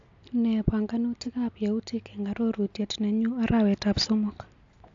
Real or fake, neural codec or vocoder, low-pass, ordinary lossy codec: real; none; 7.2 kHz; AAC, 48 kbps